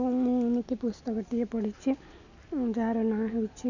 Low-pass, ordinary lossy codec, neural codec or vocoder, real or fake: 7.2 kHz; none; none; real